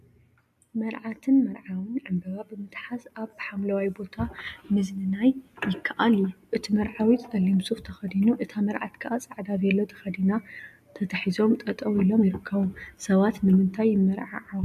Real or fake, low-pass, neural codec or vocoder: real; 14.4 kHz; none